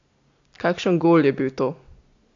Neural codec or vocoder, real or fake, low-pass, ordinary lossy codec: none; real; 7.2 kHz; AAC, 48 kbps